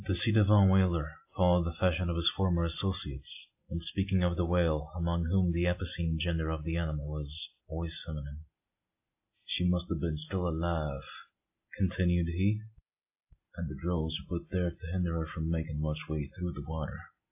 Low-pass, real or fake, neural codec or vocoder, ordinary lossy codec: 3.6 kHz; real; none; AAC, 32 kbps